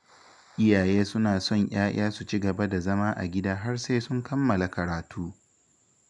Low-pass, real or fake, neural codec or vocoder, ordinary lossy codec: 10.8 kHz; real; none; none